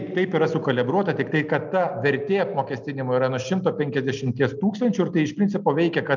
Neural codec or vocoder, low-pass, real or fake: none; 7.2 kHz; real